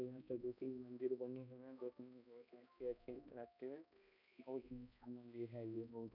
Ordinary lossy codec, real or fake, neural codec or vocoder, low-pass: none; fake; codec, 16 kHz, 1 kbps, X-Codec, HuBERT features, trained on balanced general audio; 5.4 kHz